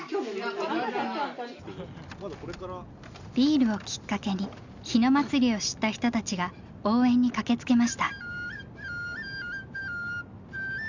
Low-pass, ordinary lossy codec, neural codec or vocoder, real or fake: 7.2 kHz; Opus, 64 kbps; none; real